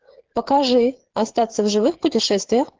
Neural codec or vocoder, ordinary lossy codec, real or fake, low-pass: codec, 16 kHz, 8 kbps, FreqCodec, smaller model; Opus, 16 kbps; fake; 7.2 kHz